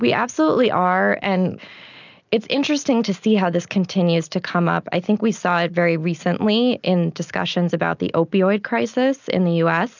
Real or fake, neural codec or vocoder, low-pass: real; none; 7.2 kHz